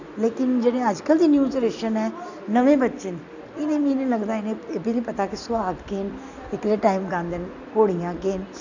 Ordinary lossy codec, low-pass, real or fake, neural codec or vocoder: none; 7.2 kHz; real; none